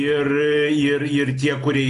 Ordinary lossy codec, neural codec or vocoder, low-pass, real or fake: AAC, 48 kbps; none; 10.8 kHz; real